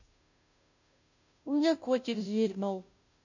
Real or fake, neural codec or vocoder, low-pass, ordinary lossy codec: fake; codec, 16 kHz, 0.5 kbps, FunCodec, trained on Chinese and English, 25 frames a second; 7.2 kHz; none